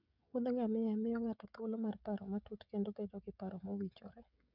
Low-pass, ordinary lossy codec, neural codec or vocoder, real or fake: 5.4 kHz; none; codec, 16 kHz, 16 kbps, FreqCodec, smaller model; fake